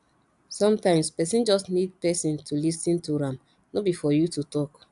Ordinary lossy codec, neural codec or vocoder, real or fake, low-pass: AAC, 96 kbps; none; real; 10.8 kHz